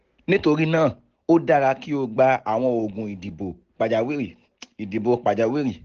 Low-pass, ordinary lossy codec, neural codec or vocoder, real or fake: 7.2 kHz; Opus, 16 kbps; none; real